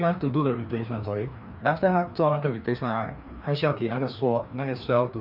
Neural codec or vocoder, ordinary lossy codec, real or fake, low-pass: codec, 16 kHz, 2 kbps, FreqCodec, larger model; none; fake; 5.4 kHz